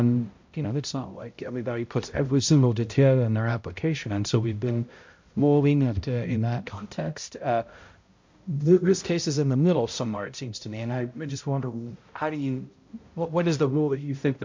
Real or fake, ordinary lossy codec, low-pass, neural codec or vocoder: fake; MP3, 48 kbps; 7.2 kHz; codec, 16 kHz, 0.5 kbps, X-Codec, HuBERT features, trained on balanced general audio